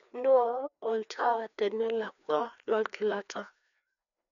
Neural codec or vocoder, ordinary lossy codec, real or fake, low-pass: codec, 16 kHz, 2 kbps, FreqCodec, larger model; none; fake; 7.2 kHz